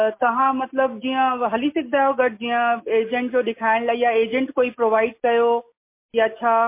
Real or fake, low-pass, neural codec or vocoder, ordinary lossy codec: real; 3.6 kHz; none; MP3, 24 kbps